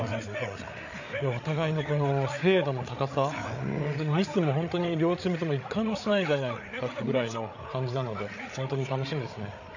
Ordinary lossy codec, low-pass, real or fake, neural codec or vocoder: none; 7.2 kHz; fake; codec, 16 kHz, 8 kbps, FreqCodec, larger model